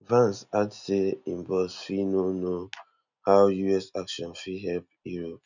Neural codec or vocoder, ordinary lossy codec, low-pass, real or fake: none; none; 7.2 kHz; real